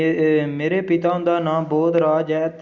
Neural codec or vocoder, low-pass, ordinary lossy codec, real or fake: none; 7.2 kHz; Opus, 64 kbps; real